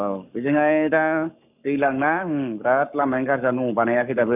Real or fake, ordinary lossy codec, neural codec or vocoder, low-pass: fake; none; codec, 44.1 kHz, 7.8 kbps, Pupu-Codec; 3.6 kHz